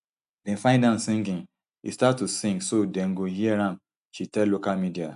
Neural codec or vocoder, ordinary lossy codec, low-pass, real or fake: none; AAC, 96 kbps; 10.8 kHz; real